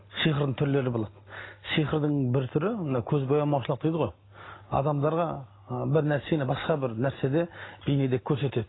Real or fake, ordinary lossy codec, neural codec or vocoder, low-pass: real; AAC, 16 kbps; none; 7.2 kHz